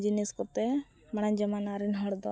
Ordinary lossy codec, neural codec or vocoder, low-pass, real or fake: none; none; none; real